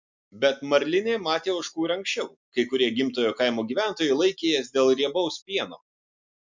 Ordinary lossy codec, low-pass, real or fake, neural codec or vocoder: MP3, 64 kbps; 7.2 kHz; real; none